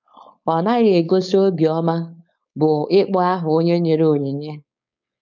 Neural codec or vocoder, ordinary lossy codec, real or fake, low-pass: codec, 16 kHz, 4.8 kbps, FACodec; none; fake; 7.2 kHz